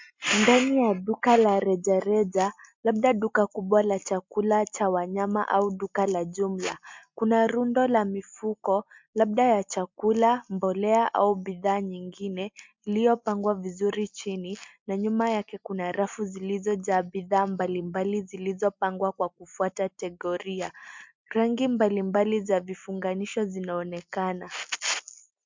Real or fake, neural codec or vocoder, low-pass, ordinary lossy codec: real; none; 7.2 kHz; MP3, 64 kbps